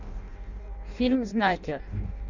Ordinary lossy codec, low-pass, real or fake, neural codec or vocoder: Opus, 64 kbps; 7.2 kHz; fake; codec, 16 kHz in and 24 kHz out, 0.6 kbps, FireRedTTS-2 codec